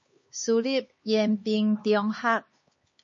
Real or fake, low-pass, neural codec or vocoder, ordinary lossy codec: fake; 7.2 kHz; codec, 16 kHz, 4 kbps, X-Codec, HuBERT features, trained on LibriSpeech; MP3, 32 kbps